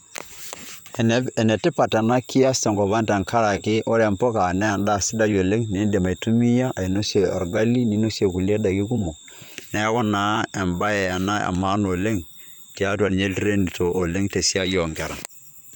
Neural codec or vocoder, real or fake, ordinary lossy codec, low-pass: vocoder, 44.1 kHz, 128 mel bands, Pupu-Vocoder; fake; none; none